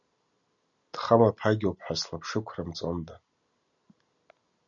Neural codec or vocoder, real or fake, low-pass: none; real; 7.2 kHz